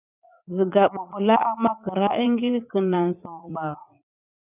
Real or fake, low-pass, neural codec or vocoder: fake; 3.6 kHz; vocoder, 44.1 kHz, 80 mel bands, Vocos